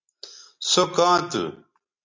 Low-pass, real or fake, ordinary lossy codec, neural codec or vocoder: 7.2 kHz; real; MP3, 48 kbps; none